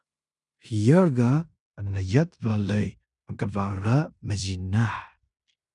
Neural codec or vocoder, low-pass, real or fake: codec, 16 kHz in and 24 kHz out, 0.9 kbps, LongCat-Audio-Codec, fine tuned four codebook decoder; 10.8 kHz; fake